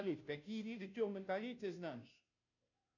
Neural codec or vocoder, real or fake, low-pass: codec, 16 kHz, 0.5 kbps, FunCodec, trained on Chinese and English, 25 frames a second; fake; 7.2 kHz